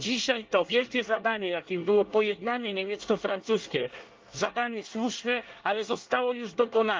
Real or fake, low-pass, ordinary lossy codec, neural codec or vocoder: fake; 7.2 kHz; Opus, 32 kbps; codec, 24 kHz, 1 kbps, SNAC